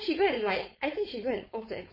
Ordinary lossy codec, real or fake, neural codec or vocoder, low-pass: MP3, 24 kbps; fake; codec, 16 kHz, 4.8 kbps, FACodec; 5.4 kHz